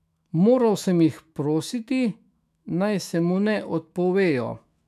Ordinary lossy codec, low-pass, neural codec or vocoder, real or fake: none; 14.4 kHz; autoencoder, 48 kHz, 128 numbers a frame, DAC-VAE, trained on Japanese speech; fake